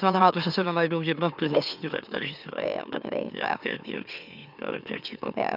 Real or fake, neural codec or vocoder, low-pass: fake; autoencoder, 44.1 kHz, a latent of 192 numbers a frame, MeloTTS; 5.4 kHz